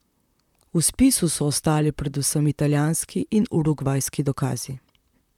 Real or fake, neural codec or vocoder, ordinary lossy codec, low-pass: fake; vocoder, 44.1 kHz, 128 mel bands, Pupu-Vocoder; none; 19.8 kHz